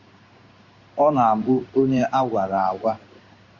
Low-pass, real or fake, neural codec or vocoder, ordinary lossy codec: 7.2 kHz; fake; codec, 16 kHz in and 24 kHz out, 1 kbps, XY-Tokenizer; Opus, 64 kbps